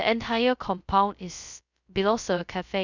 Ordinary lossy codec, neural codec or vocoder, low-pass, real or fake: none; codec, 16 kHz, 0.2 kbps, FocalCodec; 7.2 kHz; fake